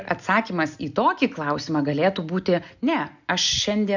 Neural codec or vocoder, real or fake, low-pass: none; real; 7.2 kHz